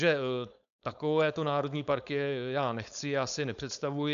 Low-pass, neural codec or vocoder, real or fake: 7.2 kHz; codec, 16 kHz, 4.8 kbps, FACodec; fake